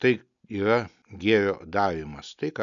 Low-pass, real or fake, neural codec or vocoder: 7.2 kHz; real; none